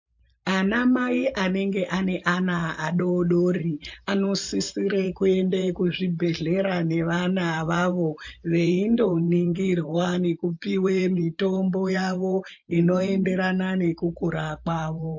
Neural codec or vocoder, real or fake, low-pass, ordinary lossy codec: vocoder, 44.1 kHz, 128 mel bands every 512 samples, BigVGAN v2; fake; 7.2 kHz; MP3, 48 kbps